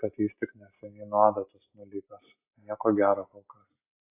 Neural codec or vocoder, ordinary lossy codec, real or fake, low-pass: none; AAC, 24 kbps; real; 3.6 kHz